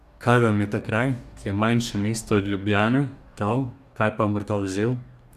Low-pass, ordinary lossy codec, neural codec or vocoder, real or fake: 14.4 kHz; none; codec, 44.1 kHz, 2.6 kbps, DAC; fake